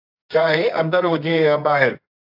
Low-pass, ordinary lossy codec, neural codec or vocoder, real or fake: 5.4 kHz; AAC, 48 kbps; codec, 24 kHz, 0.9 kbps, WavTokenizer, medium music audio release; fake